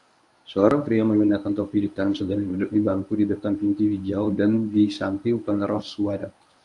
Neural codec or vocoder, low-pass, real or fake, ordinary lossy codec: codec, 24 kHz, 0.9 kbps, WavTokenizer, medium speech release version 1; 10.8 kHz; fake; AAC, 64 kbps